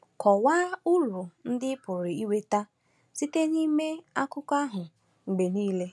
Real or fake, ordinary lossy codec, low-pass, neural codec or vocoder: real; none; none; none